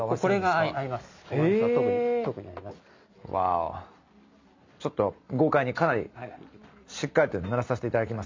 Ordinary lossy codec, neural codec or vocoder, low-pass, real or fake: MP3, 64 kbps; none; 7.2 kHz; real